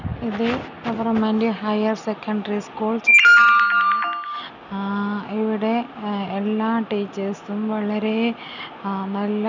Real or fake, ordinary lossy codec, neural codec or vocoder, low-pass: real; none; none; 7.2 kHz